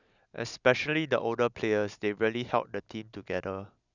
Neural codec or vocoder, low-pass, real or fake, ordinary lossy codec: none; 7.2 kHz; real; none